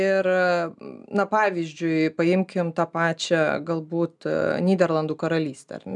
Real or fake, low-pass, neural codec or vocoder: real; 10.8 kHz; none